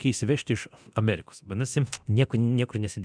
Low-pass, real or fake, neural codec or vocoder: 9.9 kHz; fake; codec, 24 kHz, 0.9 kbps, DualCodec